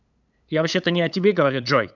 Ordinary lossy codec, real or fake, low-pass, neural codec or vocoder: none; fake; 7.2 kHz; codec, 16 kHz, 8 kbps, FunCodec, trained on LibriTTS, 25 frames a second